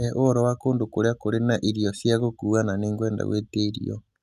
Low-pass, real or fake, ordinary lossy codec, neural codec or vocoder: 14.4 kHz; real; Opus, 64 kbps; none